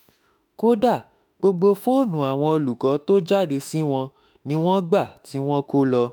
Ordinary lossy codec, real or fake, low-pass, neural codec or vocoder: none; fake; none; autoencoder, 48 kHz, 32 numbers a frame, DAC-VAE, trained on Japanese speech